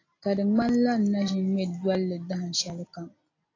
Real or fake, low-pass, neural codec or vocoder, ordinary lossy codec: real; 7.2 kHz; none; AAC, 32 kbps